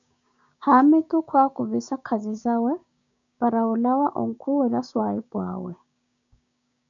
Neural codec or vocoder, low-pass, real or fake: codec, 16 kHz, 6 kbps, DAC; 7.2 kHz; fake